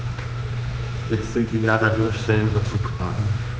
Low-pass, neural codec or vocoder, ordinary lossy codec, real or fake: none; codec, 16 kHz, 2 kbps, X-Codec, HuBERT features, trained on general audio; none; fake